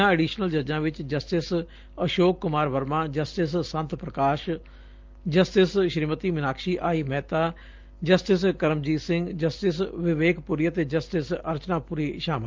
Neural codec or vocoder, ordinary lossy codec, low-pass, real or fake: none; Opus, 32 kbps; 7.2 kHz; real